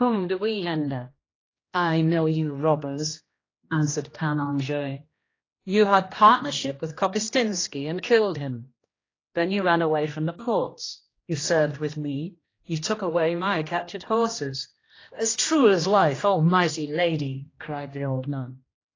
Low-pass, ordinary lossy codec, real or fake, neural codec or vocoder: 7.2 kHz; AAC, 32 kbps; fake; codec, 16 kHz, 1 kbps, X-Codec, HuBERT features, trained on general audio